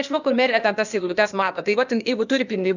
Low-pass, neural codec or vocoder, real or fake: 7.2 kHz; codec, 16 kHz, 0.8 kbps, ZipCodec; fake